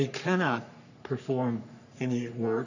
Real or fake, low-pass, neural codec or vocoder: fake; 7.2 kHz; codec, 44.1 kHz, 3.4 kbps, Pupu-Codec